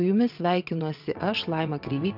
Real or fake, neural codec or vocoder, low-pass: fake; vocoder, 22.05 kHz, 80 mel bands, WaveNeXt; 5.4 kHz